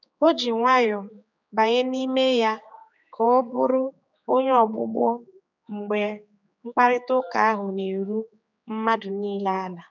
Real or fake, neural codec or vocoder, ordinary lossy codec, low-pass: fake; codec, 16 kHz, 4 kbps, X-Codec, HuBERT features, trained on general audio; none; 7.2 kHz